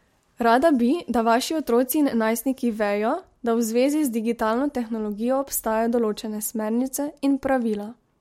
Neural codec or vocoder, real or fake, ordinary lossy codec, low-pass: none; real; MP3, 64 kbps; 19.8 kHz